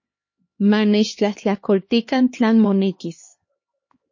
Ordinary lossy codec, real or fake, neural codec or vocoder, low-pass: MP3, 32 kbps; fake; codec, 16 kHz, 2 kbps, X-Codec, HuBERT features, trained on LibriSpeech; 7.2 kHz